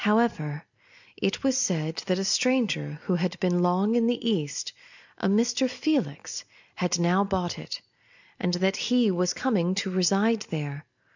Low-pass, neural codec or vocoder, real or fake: 7.2 kHz; none; real